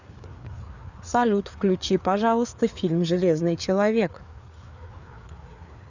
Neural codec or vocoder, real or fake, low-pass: codec, 16 kHz, 4 kbps, FreqCodec, larger model; fake; 7.2 kHz